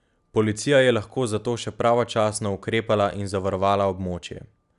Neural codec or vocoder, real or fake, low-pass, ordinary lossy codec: none; real; 10.8 kHz; none